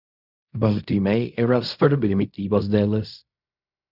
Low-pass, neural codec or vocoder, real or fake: 5.4 kHz; codec, 16 kHz in and 24 kHz out, 0.4 kbps, LongCat-Audio-Codec, fine tuned four codebook decoder; fake